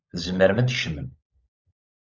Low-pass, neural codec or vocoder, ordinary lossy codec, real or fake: 7.2 kHz; codec, 16 kHz, 16 kbps, FunCodec, trained on LibriTTS, 50 frames a second; Opus, 64 kbps; fake